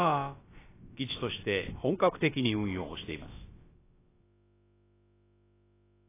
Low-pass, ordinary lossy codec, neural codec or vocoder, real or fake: 3.6 kHz; AAC, 16 kbps; codec, 16 kHz, about 1 kbps, DyCAST, with the encoder's durations; fake